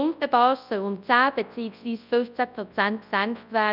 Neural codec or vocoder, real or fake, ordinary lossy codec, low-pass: codec, 24 kHz, 0.9 kbps, WavTokenizer, large speech release; fake; none; 5.4 kHz